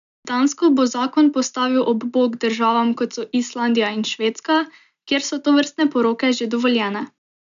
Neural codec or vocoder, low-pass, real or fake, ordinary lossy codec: none; 7.2 kHz; real; none